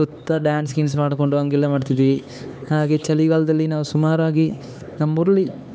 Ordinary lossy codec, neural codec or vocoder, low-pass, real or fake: none; codec, 16 kHz, 4 kbps, X-Codec, HuBERT features, trained on LibriSpeech; none; fake